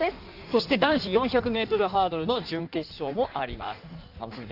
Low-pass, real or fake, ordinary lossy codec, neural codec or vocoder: 5.4 kHz; fake; AAC, 48 kbps; codec, 16 kHz in and 24 kHz out, 1.1 kbps, FireRedTTS-2 codec